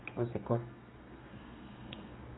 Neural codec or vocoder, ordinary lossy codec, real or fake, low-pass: codec, 32 kHz, 1.9 kbps, SNAC; AAC, 16 kbps; fake; 7.2 kHz